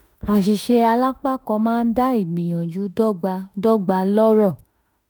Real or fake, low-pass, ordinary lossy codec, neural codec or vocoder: fake; none; none; autoencoder, 48 kHz, 32 numbers a frame, DAC-VAE, trained on Japanese speech